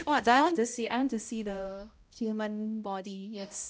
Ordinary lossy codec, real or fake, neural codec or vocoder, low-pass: none; fake; codec, 16 kHz, 0.5 kbps, X-Codec, HuBERT features, trained on balanced general audio; none